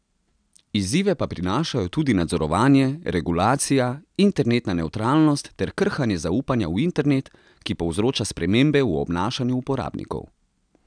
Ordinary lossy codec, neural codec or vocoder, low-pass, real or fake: none; none; 9.9 kHz; real